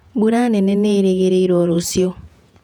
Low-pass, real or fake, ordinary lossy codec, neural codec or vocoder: 19.8 kHz; fake; none; vocoder, 48 kHz, 128 mel bands, Vocos